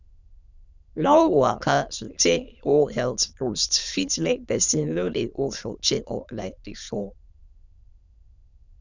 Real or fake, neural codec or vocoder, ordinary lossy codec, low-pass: fake; autoencoder, 22.05 kHz, a latent of 192 numbers a frame, VITS, trained on many speakers; none; 7.2 kHz